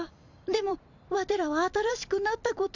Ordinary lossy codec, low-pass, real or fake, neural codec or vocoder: none; 7.2 kHz; fake; vocoder, 44.1 kHz, 128 mel bands every 256 samples, BigVGAN v2